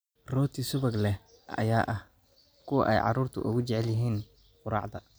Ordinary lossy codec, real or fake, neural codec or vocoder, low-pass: none; real; none; none